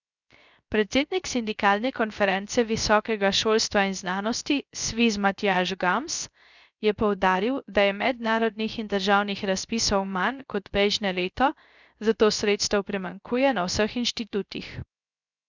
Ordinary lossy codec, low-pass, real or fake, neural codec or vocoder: none; 7.2 kHz; fake; codec, 16 kHz, 0.3 kbps, FocalCodec